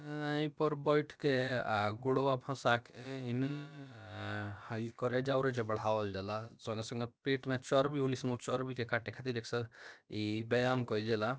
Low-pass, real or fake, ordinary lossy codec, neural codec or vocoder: none; fake; none; codec, 16 kHz, about 1 kbps, DyCAST, with the encoder's durations